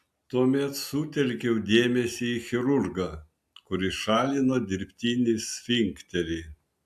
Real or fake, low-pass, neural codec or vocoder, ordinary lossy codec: real; 14.4 kHz; none; AAC, 96 kbps